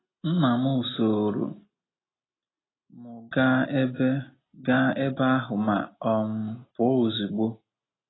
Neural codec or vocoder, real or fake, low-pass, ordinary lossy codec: none; real; 7.2 kHz; AAC, 16 kbps